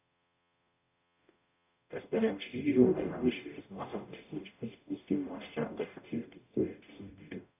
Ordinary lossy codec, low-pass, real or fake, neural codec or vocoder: none; 3.6 kHz; fake; codec, 44.1 kHz, 0.9 kbps, DAC